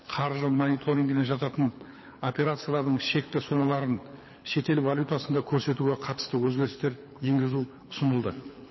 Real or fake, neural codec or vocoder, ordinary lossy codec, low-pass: fake; codec, 24 kHz, 6 kbps, HILCodec; MP3, 24 kbps; 7.2 kHz